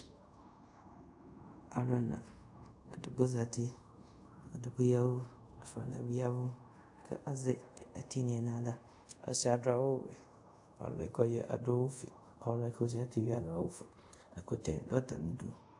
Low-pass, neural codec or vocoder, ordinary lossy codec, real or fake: 10.8 kHz; codec, 24 kHz, 0.5 kbps, DualCodec; AAC, 64 kbps; fake